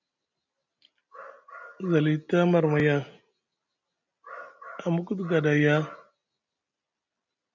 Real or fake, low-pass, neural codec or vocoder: real; 7.2 kHz; none